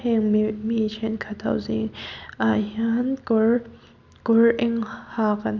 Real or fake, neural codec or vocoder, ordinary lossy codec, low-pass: real; none; none; 7.2 kHz